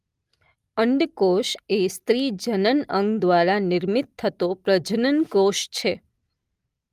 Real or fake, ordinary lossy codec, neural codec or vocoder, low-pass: real; Opus, 32 kbps; none; 14.4 kHz